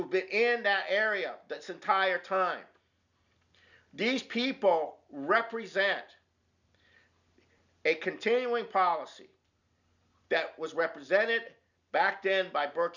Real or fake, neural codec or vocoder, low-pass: real; none; 7.2 kHz